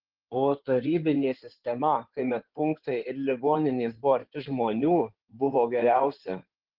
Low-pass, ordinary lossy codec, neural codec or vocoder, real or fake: 5.4 kHz; Opus, 16 kbps; codec, 16 kHz in and 24 kHz out, 2.2 kbps, FireRedTTS-2 codec; fake